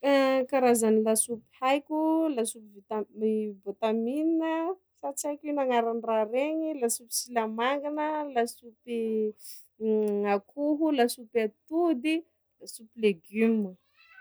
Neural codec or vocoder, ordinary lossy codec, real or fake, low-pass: none; none; real; none